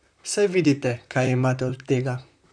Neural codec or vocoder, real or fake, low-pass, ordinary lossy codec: vocoder, 44.1 kHz, 128 mel bands, Pupu-Vocoder; fake; 9.9 kHz; none